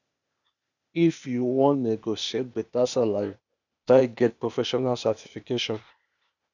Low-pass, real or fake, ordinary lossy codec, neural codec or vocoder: 7.2 kHz; fake; none; codec, 16 kHz, 0.8 kbps, ZipCodec